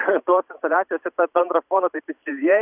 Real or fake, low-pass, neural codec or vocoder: fake; 3.6 kHz; vocoder, 44.1 kHz, 128 mel bands every 512 samples, BigVGAN v2